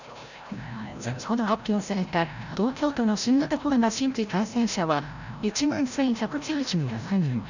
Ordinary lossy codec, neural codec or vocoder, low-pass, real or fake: none; codec, 16 kHz, 0.5 kbps, FreqCodec, larger model; 7.2 kHz; fake